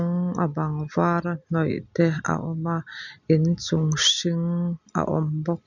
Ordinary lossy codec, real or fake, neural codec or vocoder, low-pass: none; real; none; 7.2 kHz